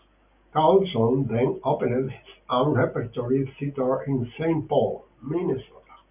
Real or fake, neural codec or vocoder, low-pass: real; none; 3.6 kHz